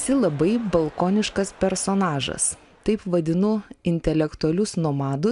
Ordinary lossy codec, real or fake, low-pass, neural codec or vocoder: MP3, 96 kbps; real; 10.8 kHz; none